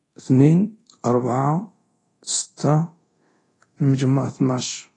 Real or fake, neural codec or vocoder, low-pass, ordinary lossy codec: fake; codec, 24 kHz, 0.9 kbps, DualCodec; 10.8 kHz; AAC, 32 kbps